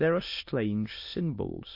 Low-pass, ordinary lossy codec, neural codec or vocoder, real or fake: 5.4 kHz; MP3, 32 kbps; autoencoder, 22.05 kHz, a latent of 192 numbers a frame, VITS, trained on many speakers; fake